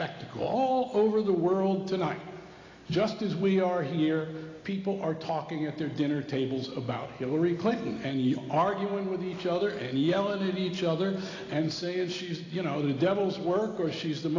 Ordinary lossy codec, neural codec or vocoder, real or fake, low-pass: AAC, 32 kbps; none; real; 7.2 kHz